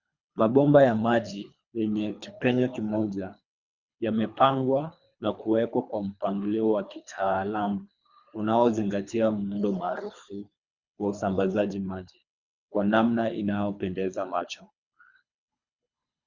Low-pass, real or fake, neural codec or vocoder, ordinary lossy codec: 7.2 kHz; fake; codec, 24 kHz, 3 kbps, HILCodec; Opus, 64 kbps